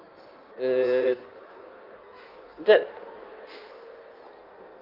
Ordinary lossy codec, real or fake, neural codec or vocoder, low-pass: Opus, 24 kbps; fake; codec, 16 kHz in and 24 kHz out, 1.1 kbps, FireRedTTS-2 codec; 5.4 kHz